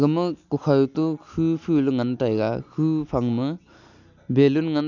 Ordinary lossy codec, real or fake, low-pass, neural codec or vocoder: none; real; 7.2 kHz; none